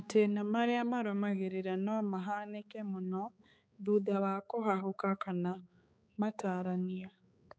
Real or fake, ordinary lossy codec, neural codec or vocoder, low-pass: fake; none; codec, 16 kHz, 4 kbps, X-Codec, HuBERT features, trained on balanced general audio; none